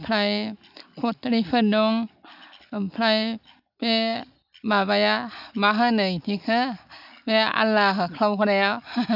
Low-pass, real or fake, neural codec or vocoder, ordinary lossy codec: 5.4 kHz; real; none; AAC, 48 kbps